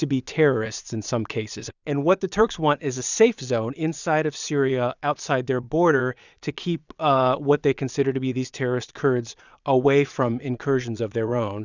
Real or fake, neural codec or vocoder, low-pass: fake; vocoder, 22.05 kHz, 80 mel bands, Vocos; 7.2 kHz